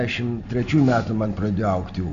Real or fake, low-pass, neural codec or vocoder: real; 7.2 kHz; none